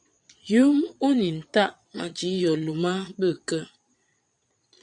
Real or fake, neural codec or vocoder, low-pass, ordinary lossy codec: fake; vocoder, 22.05 kHz, 80 mel bands, Vocos; 9.9 kHz; MP3, 96 kbps